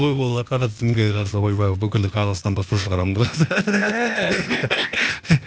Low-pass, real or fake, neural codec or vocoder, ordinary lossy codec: none; fake; codec, 16 kHz, 0.8 kbps, ZipCodec; none